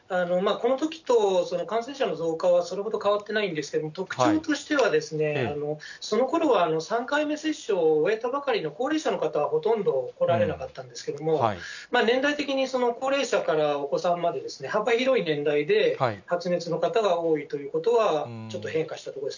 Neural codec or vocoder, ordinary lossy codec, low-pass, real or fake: none; none; 7.2 kHz; real